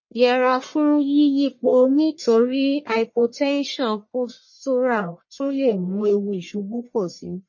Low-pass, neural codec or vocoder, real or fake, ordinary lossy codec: 7.2 kHz; codec, 44.1 kHz, 1.7 kbps, Pupu-Codec; fake; MP3, 32 kbps